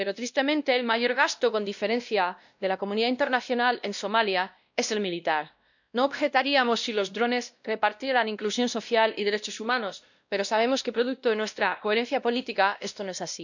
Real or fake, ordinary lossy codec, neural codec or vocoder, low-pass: fake; none; codec, 16 kHz, 1 kbps, X-Codec, WavLM features, trained on Multilingual LibriSpeech; 7.2 kHz